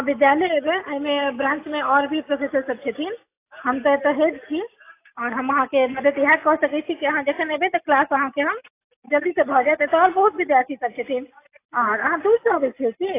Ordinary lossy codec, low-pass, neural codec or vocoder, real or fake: AAC, 24 kbps; 3.6 kHz; none; real